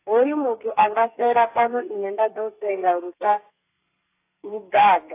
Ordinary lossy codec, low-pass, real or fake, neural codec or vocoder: AAC, 24 kbps; 3.6 kHz; fake; codec, 32 kHz, 1.9 kbps, SNAC